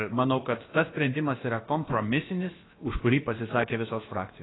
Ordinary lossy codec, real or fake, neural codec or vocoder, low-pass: AAC, 16 kbps; fake; codec, 16 kHz, about 1 kbps, DyCAST, with the encoder's durations; 7.2 kHz